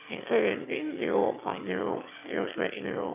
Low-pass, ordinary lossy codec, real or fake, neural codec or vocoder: 3.6 kHz; none; fake; autoencoder, 22.05 kHz, a latent of 192 numbers a frame, VITS, trained on one speaker